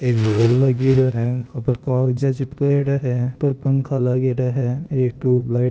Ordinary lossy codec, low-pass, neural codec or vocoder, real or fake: none; none; codec, 16 kHz, 0.8 kbps, ZipCodec; fake